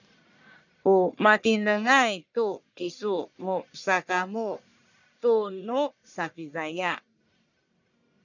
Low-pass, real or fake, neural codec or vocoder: 7.2 kHz; fake; codec, 44.1 kHz, 1.7 kbps, Pupu-Codec